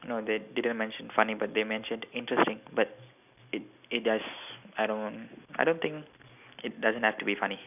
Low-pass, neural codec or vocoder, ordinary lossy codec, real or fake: 3.6 kHz; none; none; real